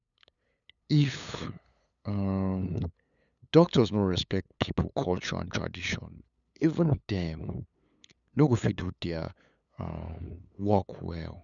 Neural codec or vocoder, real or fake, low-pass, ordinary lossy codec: codec, 16 kHz, 8 kbps, FunCodec, trained on LibriTTS, 25 frames a second; fake; 7.2 kHz; none